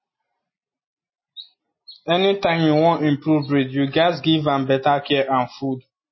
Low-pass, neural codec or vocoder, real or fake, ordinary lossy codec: 7.2 kHz; none; real; MP3, 24 kbps